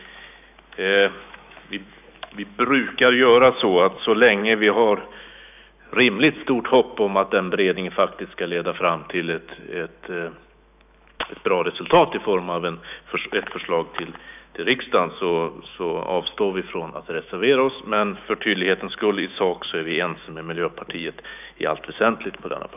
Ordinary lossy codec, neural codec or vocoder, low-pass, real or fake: none; autoencoder, 48 kHz, 128 numbers a frame, DAC-VAE, trained on Japanese speech; 3.6 kHz; fake